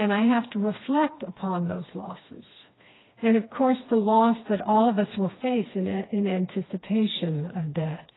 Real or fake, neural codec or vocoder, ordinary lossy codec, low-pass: fake; codec, 16 kHz, 2 kbps, FreqCodec, smaller model; AAC, 16 kbps; 7.2 kHz